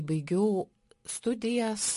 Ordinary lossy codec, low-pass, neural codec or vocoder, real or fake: MP3, 48 kbps; 14.4 kHz; none; real